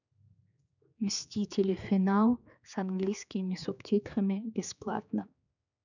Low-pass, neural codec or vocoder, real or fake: 7.2 kHz; codec, 16 kHz, 4 kbps, X-Codec, HuBERT features, trained on general audio; fake